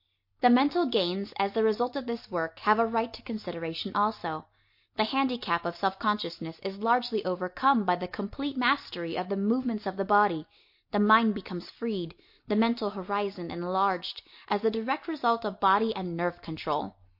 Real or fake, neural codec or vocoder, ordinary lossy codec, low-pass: real; none; MP3, 32 kbps; 5.4 kHz